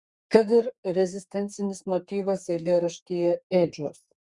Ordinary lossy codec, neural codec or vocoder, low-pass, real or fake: Opus, 64 kbps; codec, 32 kHz, 1.9 kbps, SNAC; 10.8 kHz; fake